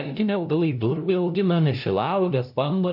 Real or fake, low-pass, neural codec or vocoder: fake; 5.4 kHz; codec, 16 kHz, 0.5 kbps, FunCodec, trained on LibriTTS, 25 frames a second